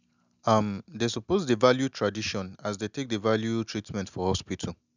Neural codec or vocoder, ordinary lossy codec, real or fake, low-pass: none; none; real; 7.2 kHz